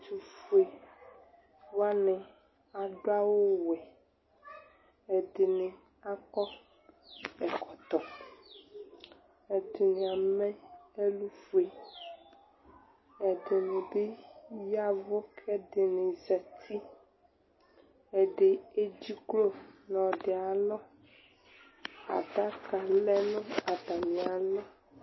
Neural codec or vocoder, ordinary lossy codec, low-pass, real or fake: none; MP3, 24 kbps; 7.2 kHz; real